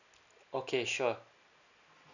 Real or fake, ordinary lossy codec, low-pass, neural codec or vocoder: real; none; 7.2 kHz; none